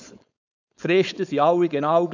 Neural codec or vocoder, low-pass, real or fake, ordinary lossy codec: codec, 16 kHz, 4.8 kbps, FACodec; 7.2 kHz; fake; none